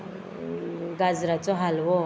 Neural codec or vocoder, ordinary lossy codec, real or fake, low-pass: none; none; real; none